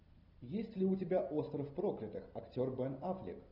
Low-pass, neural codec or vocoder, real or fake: 5.4 kHz; none; real